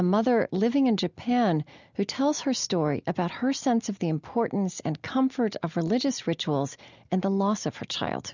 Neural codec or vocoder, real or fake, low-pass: none; real; 7.2 kHz